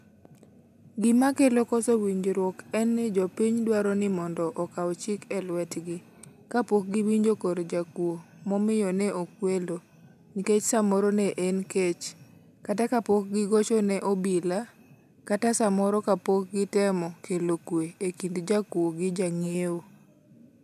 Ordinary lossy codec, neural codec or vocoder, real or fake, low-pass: none; none; real; 14.4 kHz